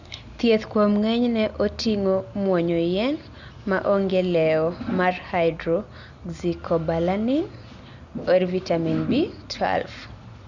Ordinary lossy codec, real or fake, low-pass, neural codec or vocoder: Opus, 64 kbps; real; 7.2 kHz; none